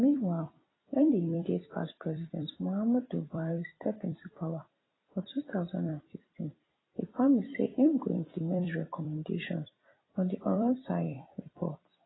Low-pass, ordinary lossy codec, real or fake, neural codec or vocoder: 7.2 kHz; AAC, 16 kbps; fake; vocoder, 44.1 kHz, 128 mel bands every 256 samples, BigVGAN v2